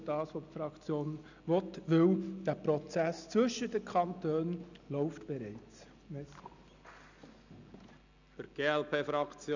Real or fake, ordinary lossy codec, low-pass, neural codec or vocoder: real; none; 7.2 kHz; none